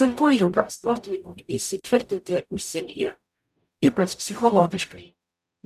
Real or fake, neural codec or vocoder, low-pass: fake; codec, 44.1 kHz, 0.9 kbps, DAC; 14.4 kHz